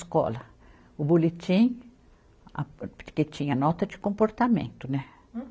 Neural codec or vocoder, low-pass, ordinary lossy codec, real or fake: none; none; none; real